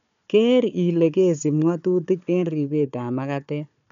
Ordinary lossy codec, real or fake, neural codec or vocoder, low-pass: none; fake; codec, 16 kHz, 4 kbps, FunCodec, trained on Chinese and English, 50 frames a second; 7.2 kHz